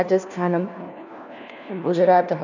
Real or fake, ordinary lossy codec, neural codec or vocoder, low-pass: fake; none; codec, 16 kHz, 1 kbps, FunCodec, trained on LibriTTS, 50 frames a second; 7.2 kHz